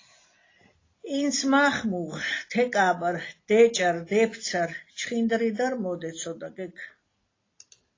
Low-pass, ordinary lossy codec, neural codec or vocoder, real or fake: 7.2 kHz; AAC, 32 kbps; none; real